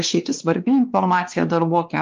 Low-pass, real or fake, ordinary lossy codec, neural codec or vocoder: 7.2 kHz; fake; Opus, 24 kbps; codec, 16 kHz, 2 kbps, X-Codec, WavLM features, trained on Multilingual LibriSpeech